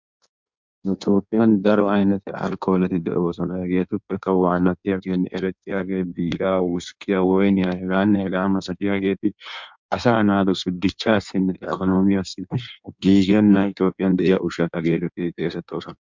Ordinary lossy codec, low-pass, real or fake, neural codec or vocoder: MP3, 64 kbps; 7.2 kHz; fake; codec, 16 kHz in and 24 kHz out, 1.1 kbps, FireRedTTS-2 codec